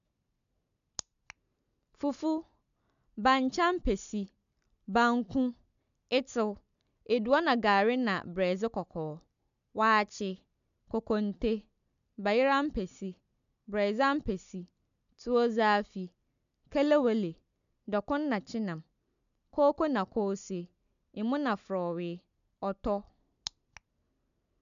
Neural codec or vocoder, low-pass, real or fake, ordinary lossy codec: none; 7.2 kHz; real; AAC, 96 kbps